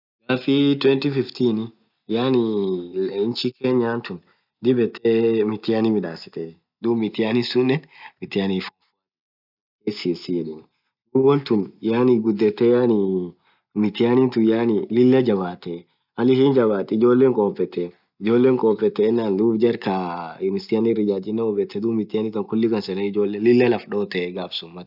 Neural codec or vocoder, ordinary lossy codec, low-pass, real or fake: none; none; 5.4 kHz; real